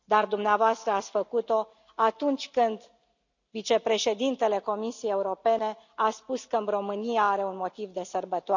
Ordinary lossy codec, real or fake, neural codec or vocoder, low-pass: none; real; none; 7.2 kHz